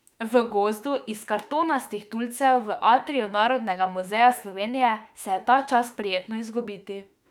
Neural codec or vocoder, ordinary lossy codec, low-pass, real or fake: autoencoder, 48 kHz, 32 numbers a frame, DAC-VAE, trained on Japanese speech; none; 19.8 kHz; fake